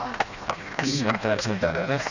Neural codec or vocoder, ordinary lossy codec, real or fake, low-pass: codec, 16 kHz, 1 kbps, FreqCodec, smaller model; none; fake; 7.2 kHz